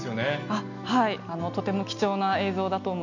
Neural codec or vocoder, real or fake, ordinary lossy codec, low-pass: none; real; none; 7.2 kHz